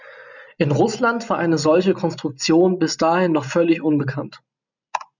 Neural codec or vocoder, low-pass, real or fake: none; 7.2 kHz; real